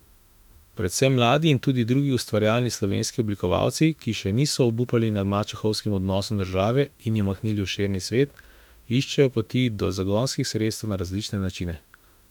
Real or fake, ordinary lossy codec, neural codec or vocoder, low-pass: fake; none; autoencoder, 48 kHz, 32 numbers a frame, DAC-VAE, trained on Japanese speech; 19.8 kHz